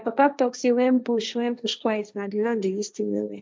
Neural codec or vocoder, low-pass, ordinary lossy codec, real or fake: codec, 16 kHz, 1.1 kbps, Voila-Tokenizer; none; none; fake